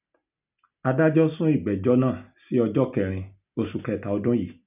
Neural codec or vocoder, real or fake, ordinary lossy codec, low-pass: none; real; none; 3.6 kHz